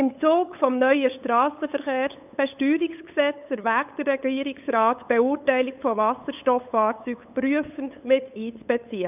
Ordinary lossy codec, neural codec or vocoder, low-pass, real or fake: none; codec, 16 kHz, 8 kbps, FunCodec, trained on LibriTTS, 25 frames a second; 3.6 kHz; fake